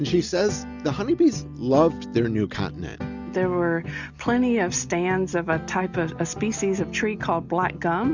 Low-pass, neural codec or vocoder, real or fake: 7.2 kHz; none; real